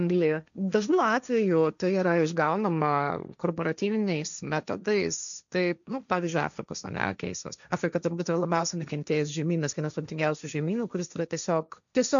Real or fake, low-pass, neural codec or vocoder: fake; 7.2 kHz; codec, 16 kHz, 1.1 kbps, Voila-Tokenizer